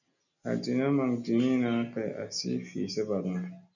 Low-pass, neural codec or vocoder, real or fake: 7.2 kHz; none; real